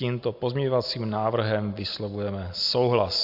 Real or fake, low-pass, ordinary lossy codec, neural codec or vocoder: real; 5.4 kHz; AAC, 48 kbps; none